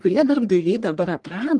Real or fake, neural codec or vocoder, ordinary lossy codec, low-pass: fake; codec, 44.1 kHz, 1.7 kbps, Pupu-Codec; Opus, 32 kbps; 9.9 kHz